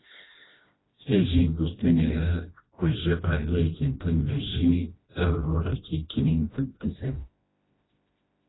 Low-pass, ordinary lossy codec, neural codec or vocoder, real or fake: 7.2 kHz; AAC, 16 kbps; codec, 16 kHz, 1 kbps, FreqCodec, smaller model; fake